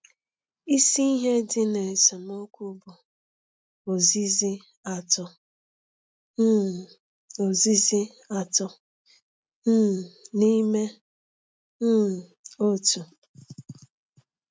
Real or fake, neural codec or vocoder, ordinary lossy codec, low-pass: real; none; none; none